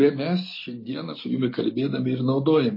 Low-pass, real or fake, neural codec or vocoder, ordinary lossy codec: 5.4 kHz; real; none; MP3, 24 kbps